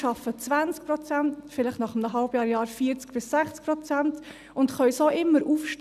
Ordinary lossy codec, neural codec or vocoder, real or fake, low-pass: none; none; real; 14.4 kHz